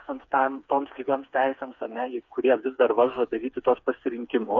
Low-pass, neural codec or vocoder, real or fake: 7.2 kHz; codec, 16 kHz, 4 kbps, FreqCodec, smaller model; fake